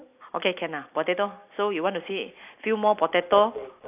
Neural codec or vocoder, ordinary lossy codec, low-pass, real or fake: none; AAC, 32 kbps; 3.6 kHz; real